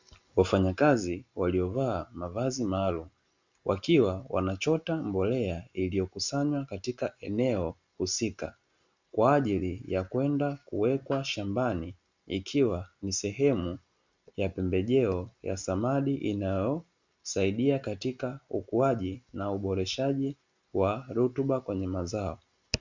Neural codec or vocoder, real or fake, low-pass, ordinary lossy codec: none; real; 7.2 kHz; Opus, 64 kbps